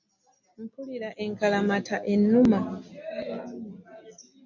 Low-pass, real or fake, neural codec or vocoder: 7.2 kHz; real; none